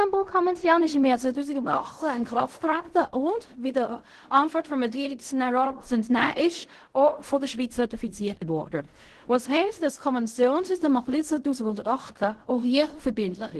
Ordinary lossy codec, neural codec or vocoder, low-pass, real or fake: Opus, 16 kbps; codec, 16 kHz in and 24 kHz out, 0.4 kbps, LongCat-Audio-Codec, fine tuned four codebook decoder; 10.8 kHz; fake